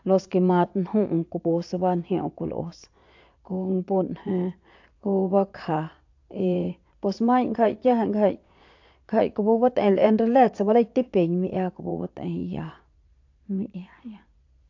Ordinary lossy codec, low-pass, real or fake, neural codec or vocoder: AAC, 48 kbps; 7.2 kHz; fake; vocoder, 22.05 kHz, 80 mel bands, Vocos